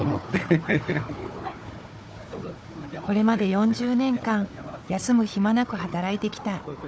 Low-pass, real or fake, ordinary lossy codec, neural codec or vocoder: none; fake; none; codec, 16 kHz, 4 kbps, FunCodec, trained on Chinese and English, 50 frames a second